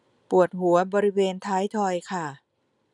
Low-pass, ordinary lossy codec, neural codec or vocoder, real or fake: 10.8 kHz; none; none; real